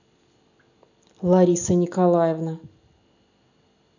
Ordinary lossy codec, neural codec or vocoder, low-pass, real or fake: none; none; 7.2 kHz; real